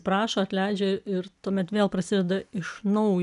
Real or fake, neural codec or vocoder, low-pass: real; none; 10.8 kHz